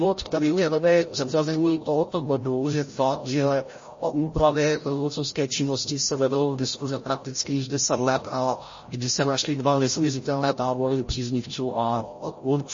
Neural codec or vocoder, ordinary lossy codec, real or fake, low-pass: codec, 16 kHz, 0.5 kbps, FreqCodec, larger model; MP3, 32 kbps; fake; 7.2 kHz